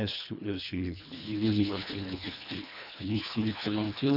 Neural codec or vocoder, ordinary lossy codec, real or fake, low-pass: codec, 24 kHz, 1.5 kbps, HILCodec; none; fake; 5.4 kHz